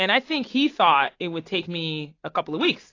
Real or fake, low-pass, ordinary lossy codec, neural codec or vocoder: real; 7.2 kHz; AAC, 32 kbps; none